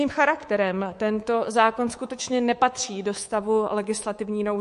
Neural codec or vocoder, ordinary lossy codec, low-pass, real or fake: codec, 24 kHz, 3.1 kbps, DualCodec; MP3, 48 kbps; 10.8 kHz; fake